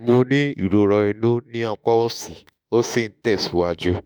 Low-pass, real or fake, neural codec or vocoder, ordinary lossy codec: none; fake; autoencoder, 48 kHz, 32 numbers a frame, DAC-VAE, trained on Japanese speech; none